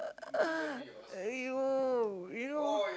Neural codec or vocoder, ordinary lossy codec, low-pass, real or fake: none; none; none; real